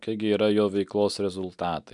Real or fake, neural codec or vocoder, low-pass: real; none; 10.8 kHz